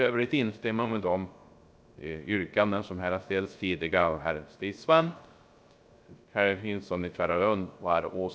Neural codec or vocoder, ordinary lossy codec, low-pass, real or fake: codec, 16 kHz, 0.3 kbps, FocalCodec; none; none; fake